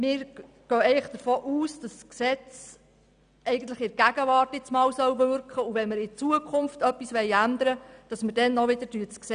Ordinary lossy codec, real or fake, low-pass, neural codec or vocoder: none; real; 9.9 kHz; none